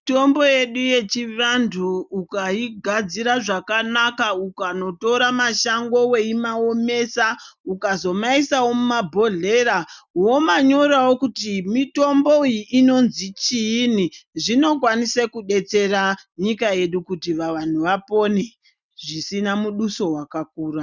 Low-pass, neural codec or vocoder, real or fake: 7.2 kHz; none; real